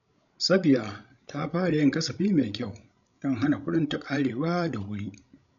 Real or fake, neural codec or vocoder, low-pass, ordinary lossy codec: fake; codec, 16 kHz, 16 kbps, FreqCodec, larger model; 7.2 kHz; none